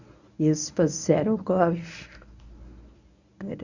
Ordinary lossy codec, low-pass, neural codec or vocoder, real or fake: none; 7.2 kHz; codec, 24 kHz, 0.9 kbps, WavTokenizer, medium speech release version 1; fake